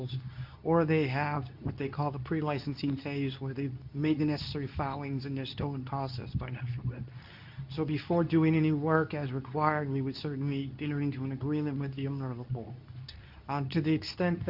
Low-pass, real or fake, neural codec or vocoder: 5.4 kHz; fake; codec, 24 kHz, 0.9 kbps, WavTokenizer, medium speech release version 2